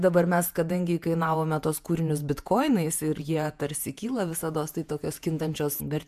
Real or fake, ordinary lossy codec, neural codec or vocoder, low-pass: fake; MP3, 96 kbps; vocoder, 48 kHz, 128 mel bands, Vocos; 14.4 kHz